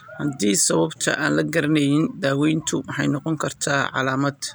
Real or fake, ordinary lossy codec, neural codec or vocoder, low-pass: real; none; none; none